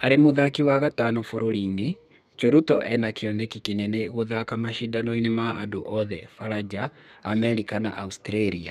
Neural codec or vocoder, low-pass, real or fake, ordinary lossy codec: codec, 32 kHz, 1.9 kbps, SNAC; 14.4 kHz; fake; none